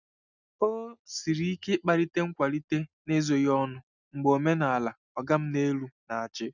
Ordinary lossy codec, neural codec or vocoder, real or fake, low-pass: none; none; real; 7.2 kHz